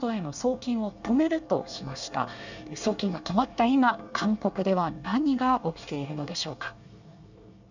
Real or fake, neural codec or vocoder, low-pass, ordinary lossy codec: fake; codec, 24 kHz, 1 kbps, SNAC; 7.2 kHz; none